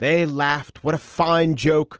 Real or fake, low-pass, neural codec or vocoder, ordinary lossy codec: real; 7.2 kHz; none; Opus, 16 kbps